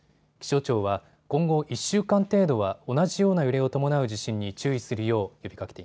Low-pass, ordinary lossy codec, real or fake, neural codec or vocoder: none; none; real; none